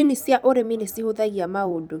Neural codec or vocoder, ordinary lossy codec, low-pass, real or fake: vocoder, 44.1 kHz, 128 mel bands every 256 samples, BigVGAN v2; none; none; fake